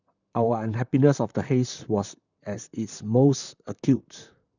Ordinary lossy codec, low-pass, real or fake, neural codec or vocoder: none; 7.2 kHz; fake; vocoder, 44.1 kHz, 128 mel bands, Pupu-Vocoder